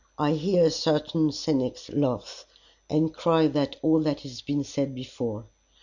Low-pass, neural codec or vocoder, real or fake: 7.2 kHz; none; real